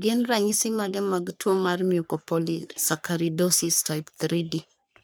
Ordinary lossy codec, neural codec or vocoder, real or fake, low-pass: none; codec, 44.1 kHz, 3.4 kbps, Pupu-Codec; fake; none